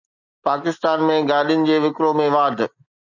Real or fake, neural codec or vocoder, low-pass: real; none; 7.2 kHz